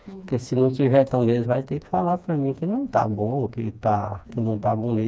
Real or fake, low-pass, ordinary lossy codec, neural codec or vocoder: fake; none; none; codec, 16 kHz, 2 kbps, FreqCodec, smaller model